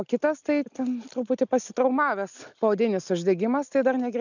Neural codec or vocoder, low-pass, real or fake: none; 7.2 kHz; real